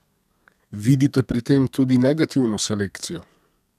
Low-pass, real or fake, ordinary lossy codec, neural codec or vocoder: 14.4 kHz; fake; none; codec, 32 kHz, 1.9 kbps, SNAC